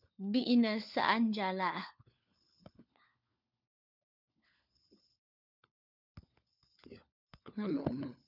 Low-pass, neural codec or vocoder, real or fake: 5.4 kHz; codec, 16 kHz, 4 kbps, FunCodec, trained on LibriTTS, 50 frames a second; fake